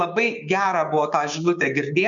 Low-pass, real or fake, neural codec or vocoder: 7.2 kHz; fake; codec, 16 kHz, 6 kbps, DAC